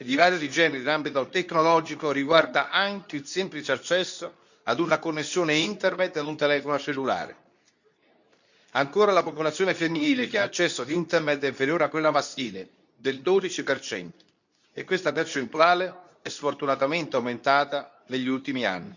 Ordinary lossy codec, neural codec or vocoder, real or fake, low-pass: none; codec, 24 kHz, 0.9 kbps, WavTokenizer, medium speech release version 2; fake; 7.2 kHz